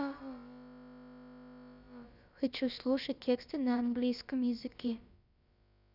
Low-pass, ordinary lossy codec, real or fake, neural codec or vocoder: 5.4 kHz; none; fake; codec, 16 kHz, about 1 kbps, DyCAST, with the encoder's durations